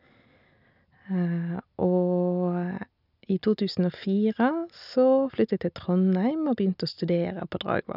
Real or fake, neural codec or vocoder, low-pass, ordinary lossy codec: real; none; 5.4 kHz; none